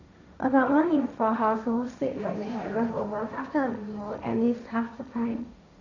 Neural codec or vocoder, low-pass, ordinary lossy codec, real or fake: codec, 16 kHz, 1.1 kbps, Voila-Tokenizer; 7.2 kHz; none; fake